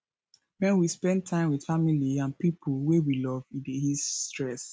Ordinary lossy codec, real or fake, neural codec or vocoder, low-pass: none; real; none; none